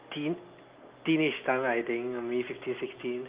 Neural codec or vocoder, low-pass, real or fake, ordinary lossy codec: none; 3.6 kHz; real; Opus, 32 kbps